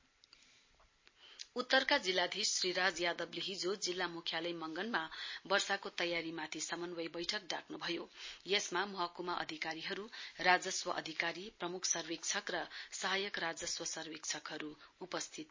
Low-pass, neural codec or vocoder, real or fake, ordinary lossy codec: 7.2 kHz; none; real; MP3, 32 kbps